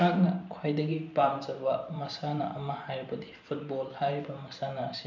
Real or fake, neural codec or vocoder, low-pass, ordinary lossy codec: fake; vocoder, 44.1 kHz, 128 mel bands every 512 samples, BigVGAN v2; 7.2 kHz; none